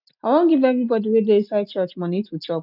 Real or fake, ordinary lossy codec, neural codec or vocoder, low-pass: real; none; none; 5.4 kHz